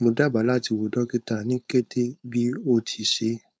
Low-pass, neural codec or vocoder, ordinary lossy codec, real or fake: none; codec, 16 kHz, 4.8 kbps, FACodec; none; fake